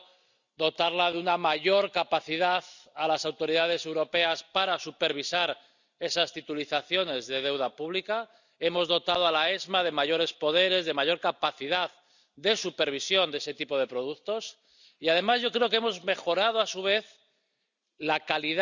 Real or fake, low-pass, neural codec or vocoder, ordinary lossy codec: real; 7.2 kHz; none; none